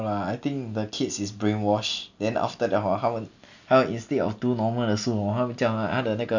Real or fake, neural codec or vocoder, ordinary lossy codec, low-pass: real; none; none; 7.2 kHz